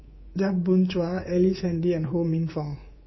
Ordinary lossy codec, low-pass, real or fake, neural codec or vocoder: MP3, 24 kbps; 7.2 kHz; fake; codec, 24 kHz, 3.1 kbps, DualCodec